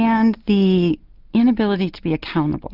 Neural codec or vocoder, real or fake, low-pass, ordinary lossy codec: none; real; 5.4 kHz; Opus, 32 kbps